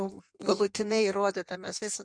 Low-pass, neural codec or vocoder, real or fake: 9.9 kHz; codec, 16 kHz in and 24 kHz out, 1.1 kbps, FireRedTTS-2 codec; fake